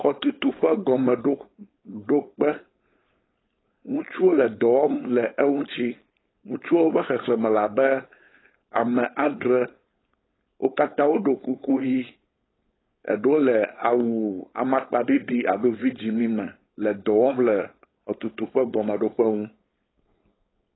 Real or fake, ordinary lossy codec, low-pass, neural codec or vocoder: fake; AAC, 16 kbps; 7.2 kHz; codec, 16 kHz, 4.8 kbps, FACodec